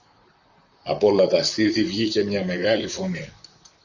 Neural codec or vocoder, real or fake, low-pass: vocoder, 44.1 kHz, 128 mel bands, Pupu-Vocoder; fake; 7.2 kHz